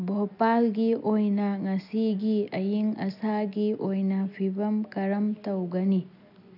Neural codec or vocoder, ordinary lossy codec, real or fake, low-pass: none; none; real; 5.4 kHz